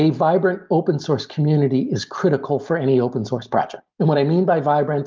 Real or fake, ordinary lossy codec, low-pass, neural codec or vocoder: real; Opus, 24 kbps; 7.2 kHz; none